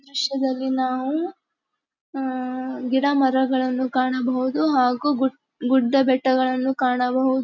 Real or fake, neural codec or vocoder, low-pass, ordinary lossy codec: real; none; 7.2 kHz; none